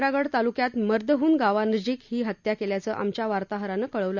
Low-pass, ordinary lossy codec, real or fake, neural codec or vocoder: 7.2 kHz; none; real; none